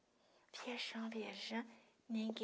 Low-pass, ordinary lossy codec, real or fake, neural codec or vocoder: none; none; real; none